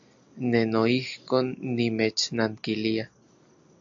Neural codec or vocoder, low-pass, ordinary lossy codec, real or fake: none; 7.2 kHz; AAC, 64 kbps; real